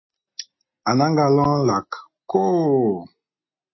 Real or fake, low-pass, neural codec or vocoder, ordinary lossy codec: real; 7.2 kHz; none; MP3, 24 kbps